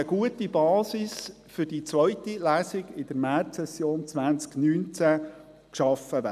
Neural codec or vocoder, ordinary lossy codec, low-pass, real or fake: none; none; 14.4 kHz; real